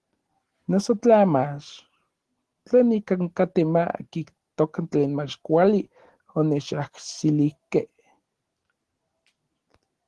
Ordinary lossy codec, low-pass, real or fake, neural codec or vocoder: Opus, 16 kbps; 10.8 kHz; real; none